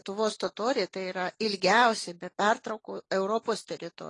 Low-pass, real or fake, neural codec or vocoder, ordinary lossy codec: 10.8 kHz; real; none; AAC, 32 kbps